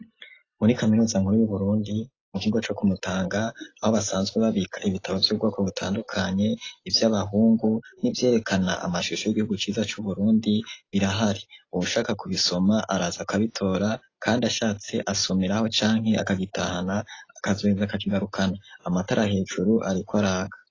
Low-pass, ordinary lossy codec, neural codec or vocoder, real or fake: 7.2 kHz; AAC, 32 kbps; none; real